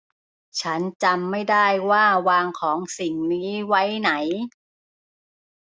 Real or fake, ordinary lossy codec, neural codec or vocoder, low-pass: real; none; none; none